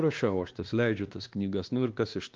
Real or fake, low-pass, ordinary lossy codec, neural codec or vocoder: fake; 7.2 kHz; Opus, 32 kbps; codec, 16 kHz, 2 kbps, X-Codec, WavLM features, trained on Multilingual LibriSpeech